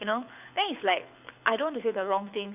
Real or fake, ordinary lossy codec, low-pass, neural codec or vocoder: fake; none; 3.6 kHz; codec, 24 kHz, 6 kbps, HILCodec